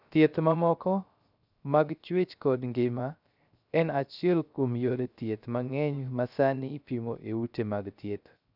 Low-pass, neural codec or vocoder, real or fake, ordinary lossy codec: 5.4 kHz; codec, 16 kHz, 0.3 kbps, FocalCodec; fake; none